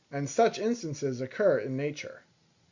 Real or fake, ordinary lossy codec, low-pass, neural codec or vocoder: real; Opus, 64 kbps; 7.2 kHz; none